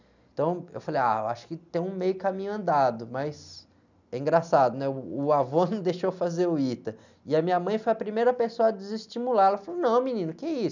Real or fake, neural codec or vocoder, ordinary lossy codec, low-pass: real; none; none; 7.2 kHz